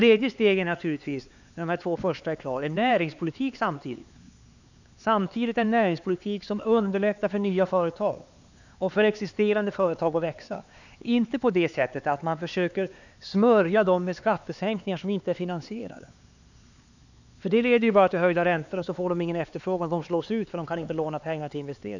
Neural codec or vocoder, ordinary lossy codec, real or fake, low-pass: codec, 16 kHz, 4 kbps, X-Codec, HuBERT features, trained on LibriSpeech; none; fake; 7.2 kHz